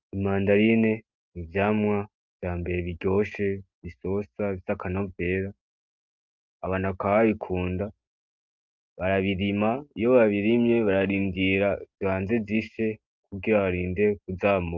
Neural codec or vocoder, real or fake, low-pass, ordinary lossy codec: none; real; 7.2 kHz; Opus, 32 kbps